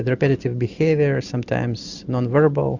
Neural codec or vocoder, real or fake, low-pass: none; real; 7.2 kHz